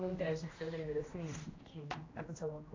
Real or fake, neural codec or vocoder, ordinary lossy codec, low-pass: fake; codec, 16 kHz, 1 kbps, X-Codec, HuBERT features, trained on general audio; AAC, 32 kbps; 7.2 kHz